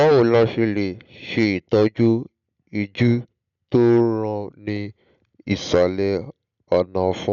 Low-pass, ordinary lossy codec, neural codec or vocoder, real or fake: 7.2 kHz; none; none; real